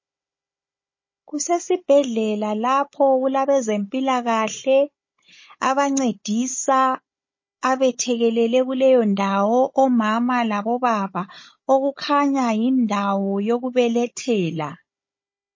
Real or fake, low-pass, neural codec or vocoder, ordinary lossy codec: fake; 7.2 kHz; codec, 16 kHz, 16 kbps, FunCodec, trained on Chinese and English, 50 frames a second; MP3, 32 kbps